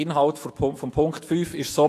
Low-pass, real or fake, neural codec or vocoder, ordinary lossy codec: 14.4 kHz; fake; autoencoder, 48 kHz, 128 numbers a frame, DAC-VAE, trained on Japanese speech; AAC, 48 kbps